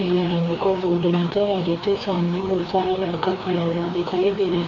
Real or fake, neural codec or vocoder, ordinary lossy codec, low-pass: fake; codec, 16 kHz, 2 kbps, FreqCodec, larger model; none; 7.2 kHz